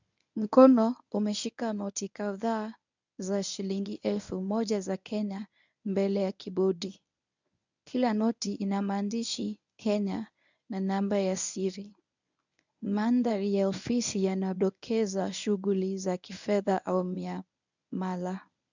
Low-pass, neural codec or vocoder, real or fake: 7.2 kHz; codec, 24 kHz, 0.9 kbps, WavTokenizer, medium speech release version 1; fake